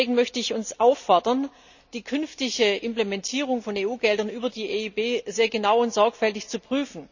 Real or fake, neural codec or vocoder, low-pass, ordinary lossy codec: real; none; 7.2 kHz; none